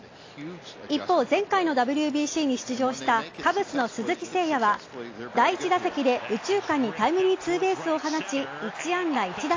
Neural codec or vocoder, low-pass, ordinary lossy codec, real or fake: none; 7.2 kHz; AAC, 32 kbps; real